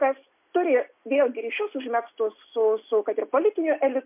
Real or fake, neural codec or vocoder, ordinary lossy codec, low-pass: real; none; MP3, 32 kbps; 3.6 kHz